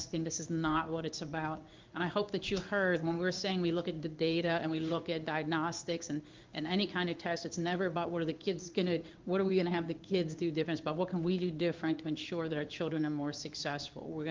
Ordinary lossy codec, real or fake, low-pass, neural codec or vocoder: Opus, 24 kbps; fake; 7.2 kHz; codec, 16 kHz in and 24 kHz out, 1 kbps, XY-Tokenizer